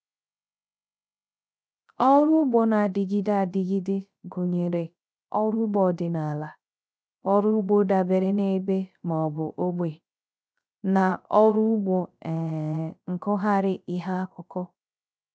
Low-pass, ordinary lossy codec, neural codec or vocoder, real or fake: none; none; codec, 16 kHz, 0.3 kbps, FocalCodec; fake